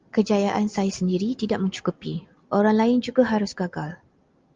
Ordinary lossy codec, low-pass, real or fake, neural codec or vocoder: Opus, 32 kbps; 7.2 kHz; real; none